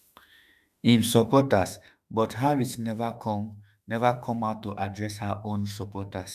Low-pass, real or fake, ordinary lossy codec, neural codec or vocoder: 14.4 kHz; fake; none; autoencoder, 48 kHz, 32 numbers a frame, DAC-VAE, trained on Japanese speech